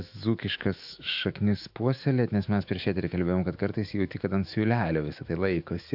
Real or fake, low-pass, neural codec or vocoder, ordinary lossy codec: fake; 5.4 kHz; autoencoder, 48 kHz, 128 numbers a frame, DAC-VAE, trained on Japanese speech; AAC, 48 kbps